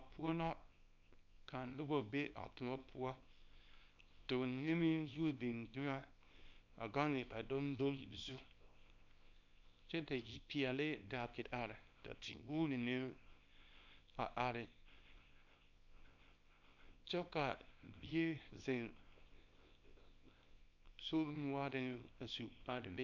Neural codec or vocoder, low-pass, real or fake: codec, 24 kHz, 0.9 kbps, WavTokenizer, small release; 7.2 kHz; fake